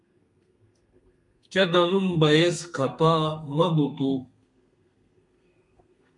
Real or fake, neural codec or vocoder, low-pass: fake; codec, 32 kHz, 1.9 kbps, SNAC; 10.8 kHz